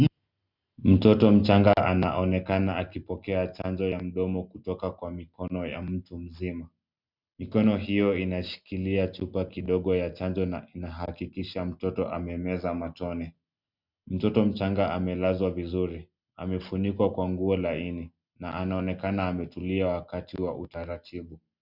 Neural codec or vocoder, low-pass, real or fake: none; 5.4 kHz; real